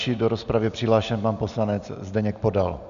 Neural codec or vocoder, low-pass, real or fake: none; 7.2 kHz; real